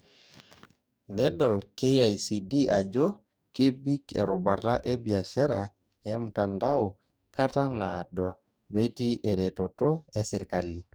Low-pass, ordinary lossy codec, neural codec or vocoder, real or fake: none; none; codec, 44.1 kHz, 2.6 kbps, DAC; fake